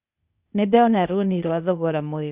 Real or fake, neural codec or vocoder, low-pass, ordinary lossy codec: fake; codec, 16 kHz, 0.8 kbps, ZipCodec; 3.6 kHz; Opus, 64 kbps